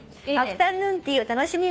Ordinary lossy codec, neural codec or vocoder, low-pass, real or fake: none; codec, 16 kHz, 2 kbps, FunCodec, trained on Chinese and English, 25 frames a second; none; fake